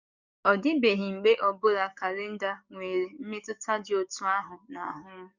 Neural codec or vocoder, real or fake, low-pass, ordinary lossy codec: codec, 44.1 kHz, 7.8 kbps, DAC; fake; 7.2 kHz; Opus, 64 kbps